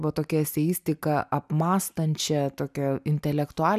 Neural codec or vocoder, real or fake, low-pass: none; real; 14.4 kHz